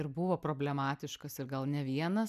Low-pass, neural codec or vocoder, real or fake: 14.4 kHz; none; real